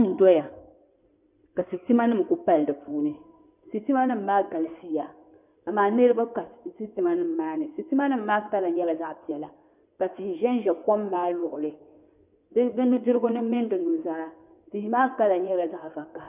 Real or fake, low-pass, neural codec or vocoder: fake; 3.6 kHz; codec, 16 kHz in and 24 kHz out, 2.2 kbps, FireRedTTS-2 codec